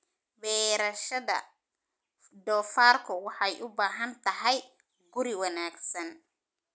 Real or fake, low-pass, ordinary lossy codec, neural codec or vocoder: real; none; none; none